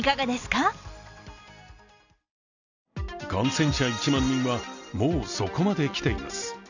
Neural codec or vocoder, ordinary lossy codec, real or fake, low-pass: none; none; real; 7.2 kHz